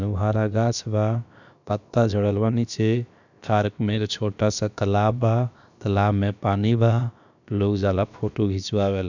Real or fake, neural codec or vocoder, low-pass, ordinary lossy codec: fake; codec, 16 kHz, about 1 kbps, DyCAST, with the encoder's durations; 7.2 kHz; none